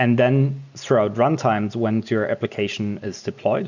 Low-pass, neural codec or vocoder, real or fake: 7.2 kHz; none; real